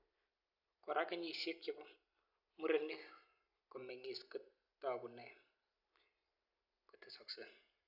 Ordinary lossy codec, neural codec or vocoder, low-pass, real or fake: none; none; 5.4 kHz; real